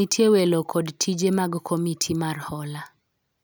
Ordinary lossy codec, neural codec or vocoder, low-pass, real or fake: none; none; none; real